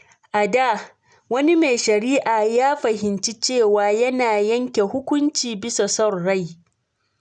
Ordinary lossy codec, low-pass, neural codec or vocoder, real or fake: none; 9.9 kHz; none; real